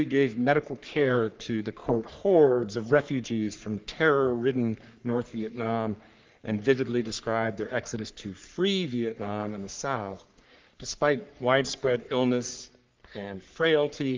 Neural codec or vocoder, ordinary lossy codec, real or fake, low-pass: codec, 44.1 kHz, 3.4 kbps, Pupu-Codec; Opus, 24 kbps; fake; 7.2 kHz